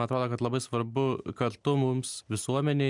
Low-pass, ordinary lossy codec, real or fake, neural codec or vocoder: 10.8 kHz; MP3, 96 kbps; real; none